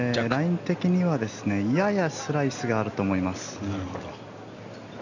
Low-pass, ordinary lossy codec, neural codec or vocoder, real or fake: 7.2 kHz; none; none; real